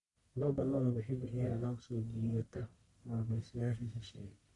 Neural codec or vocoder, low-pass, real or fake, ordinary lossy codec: codec, 44.1 kHz, 1.7 kbps, Pupu-Codec; 10.8 kHz; fake; none